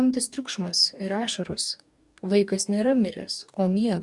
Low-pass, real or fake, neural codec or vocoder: 10.8 kHz; fake; codec, 44.1 kHz, 2.6 kbps, DAC